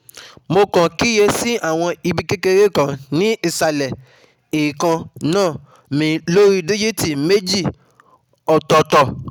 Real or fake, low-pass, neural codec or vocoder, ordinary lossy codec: real; 19.8 kHz; none; none